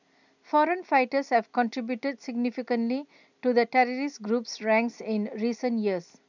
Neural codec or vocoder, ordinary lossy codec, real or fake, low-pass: none; none; real; 7.2 kHz